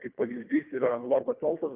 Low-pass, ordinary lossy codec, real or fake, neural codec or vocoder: 3.6 kHz; Opus, 24 kbps; fake; codec, 24 kHz, 1.5 kbps, HILCodec